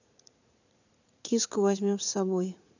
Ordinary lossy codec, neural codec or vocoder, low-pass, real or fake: none; vocoder, 44.1 kHz, 80 mel bands, Vocos; 7.2 kHz; fake